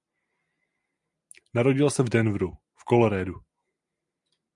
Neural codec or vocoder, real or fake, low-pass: none; real; 10.8 kHz